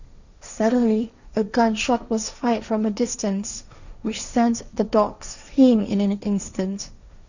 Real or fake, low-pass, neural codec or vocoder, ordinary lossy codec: fake; 7.2 kHz; codec, 16 kHz, 1.1 kbps, Voila-Tokenizer; none